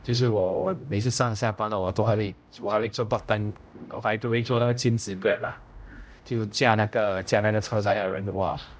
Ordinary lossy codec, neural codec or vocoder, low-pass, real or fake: none; codec, 16 kHz, 0.5 kbps, X-Codec, HuBERT features, trained on general audio; none; fake